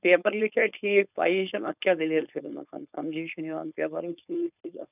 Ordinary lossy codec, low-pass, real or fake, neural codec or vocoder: none; 3.6 kHz; fake; codec, 16 kHz, 4.8 kbps, FACodec